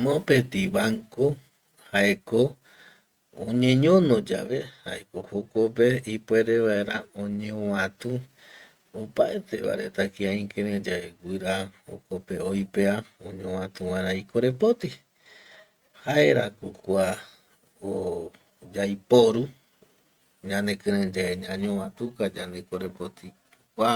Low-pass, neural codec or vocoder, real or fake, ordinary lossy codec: 19.8 kHz; vocoder, 48 kHz, 128 mel bands, Vocos; fake; Opus, 64 kbps